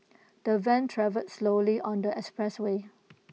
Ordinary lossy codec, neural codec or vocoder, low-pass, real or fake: none; none; none; real